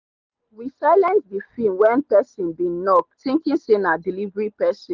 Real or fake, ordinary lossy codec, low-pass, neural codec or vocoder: real; Opus, 24 kbps; 7.2 kHz; none